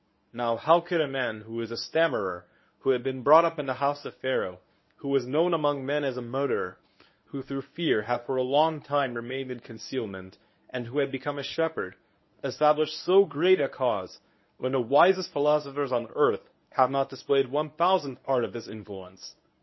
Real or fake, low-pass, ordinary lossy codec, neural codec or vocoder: fake; 7.2 kHz; MP3, 24 kbps; codec, 24 kHz, 0.9 kbps, WavTokenizer, medium speech release version 1